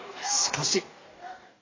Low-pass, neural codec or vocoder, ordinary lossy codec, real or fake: 7.2 kHz; codec, 44.1 kHz, 2.6 kbps, DAC; AAC, 32 kbps; fake